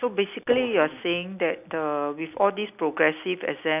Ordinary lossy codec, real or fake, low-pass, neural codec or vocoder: none; real; 3.6 kHz; none